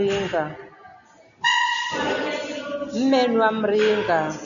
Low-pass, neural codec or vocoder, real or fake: 7.2 kHz; none; real